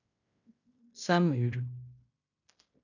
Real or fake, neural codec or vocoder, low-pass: fake; codec, 16 kHz in and 24 kHz out, 0.9 kbps, LongCat-Audio-Codec, fine tuned four codebook decoder; 7.2 kHz